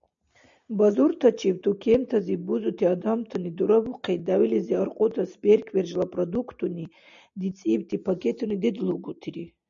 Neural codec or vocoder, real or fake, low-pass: none; real; 7.2 kHz